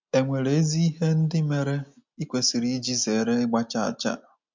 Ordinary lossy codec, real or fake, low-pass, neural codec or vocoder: MP3, 64 kbps; real; 7.2 kHz; none